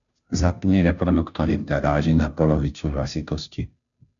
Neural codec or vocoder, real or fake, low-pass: codec, 16 kHz, 0.5 kbps, FunCodec, trained on Chinese and English, 25 frames a second; fake; 7.2 kHz